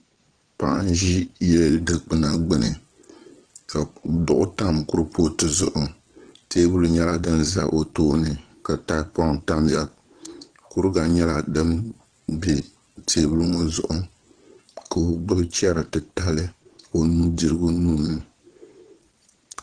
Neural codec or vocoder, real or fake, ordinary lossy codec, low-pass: vocoder, 22.05 kHz, 80 mel bands, Vocos; fake; Opus, 16 kbps; 9.9 kHz